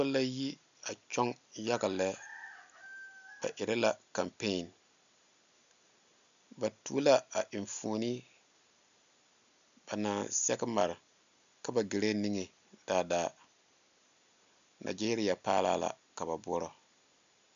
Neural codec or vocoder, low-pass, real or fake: none; 7.2 kHz; real